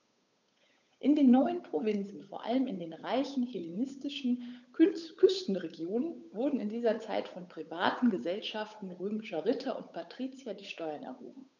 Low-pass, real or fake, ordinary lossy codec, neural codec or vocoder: 7.2 kHz; fake; none; codec, 16 kHz, 8 kbps, FunCodec, trained on Chinese and English, 25 frames a second